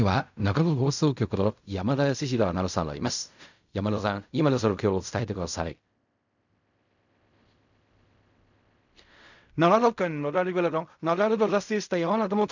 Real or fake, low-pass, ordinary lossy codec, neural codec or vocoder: fake; 7.2 kHz; none; codec, 16 kHz in and 24 kHz out, 0.4 kbps, LongCat-Audio-Codec, fine tuned four codebook decoder